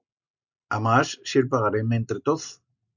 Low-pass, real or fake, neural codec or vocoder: 7.2 kHz; real; none